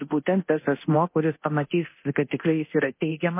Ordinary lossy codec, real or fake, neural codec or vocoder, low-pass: MP3, 24 kbps; fake; codec, 24 kHz, 0.9 kbps, DualCodec; 3.6 kHz